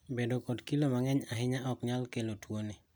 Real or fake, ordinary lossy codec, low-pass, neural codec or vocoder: real; none; none; none